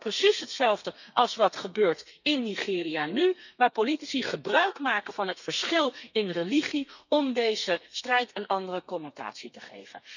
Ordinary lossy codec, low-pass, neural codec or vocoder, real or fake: none; 7.2 kHz; codec, 32 kHz, 1.9 kbps, SNAC; fake